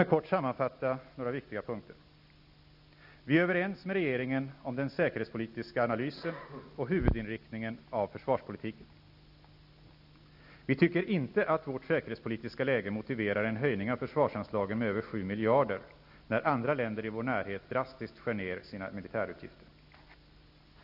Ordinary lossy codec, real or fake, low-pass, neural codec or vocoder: none; real; 5.4 kHz; none